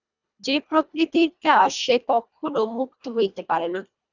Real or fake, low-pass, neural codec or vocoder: fake; 7.2 kHz; codec, 24 kHz, 1.5 kbps, HILCodec